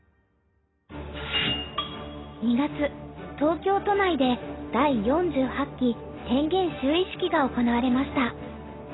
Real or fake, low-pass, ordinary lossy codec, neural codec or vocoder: real; 7.2 kHz; AAC, 16 kbps; none